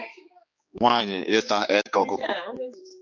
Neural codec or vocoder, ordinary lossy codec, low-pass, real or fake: codec, 16 kHz, 4 kbps, X-Codec, HuBERT features, trained on general audio; MP3, 48 kbps; 7.2 kHz; fake